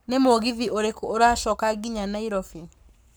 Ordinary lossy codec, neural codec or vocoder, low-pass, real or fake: none; codec, 44.1 kHz, 7.8 kbps, Pupu-Codec; none; fake